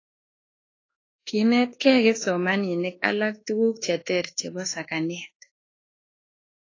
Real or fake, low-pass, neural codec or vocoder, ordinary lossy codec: fake; 7.2 kHz; codec, 24 kHz, 1.2 kbps, DualCodec; AAC, 32 kbps